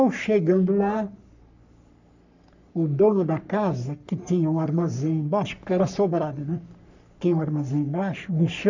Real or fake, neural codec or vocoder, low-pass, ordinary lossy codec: fake; codec, 44.1 kHz, 3.4 kbps, Pupu-Codec; 7.2 kHz; none